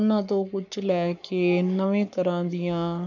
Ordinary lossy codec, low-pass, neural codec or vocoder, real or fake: none; 7.2 kHz; codec, 44.1 kHz, 7.8 kbps, Pupu-Codec; fake